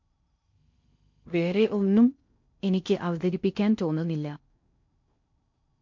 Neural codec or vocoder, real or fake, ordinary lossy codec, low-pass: codec, 16 kHz in and 24 kHz out, 0.6 kbps, FocalCodec, streaming, 4096 codes; fake; MP3, 48 kbps; 7.2 kHz